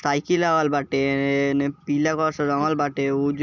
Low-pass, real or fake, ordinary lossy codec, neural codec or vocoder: 7.2 kHz; real; none; none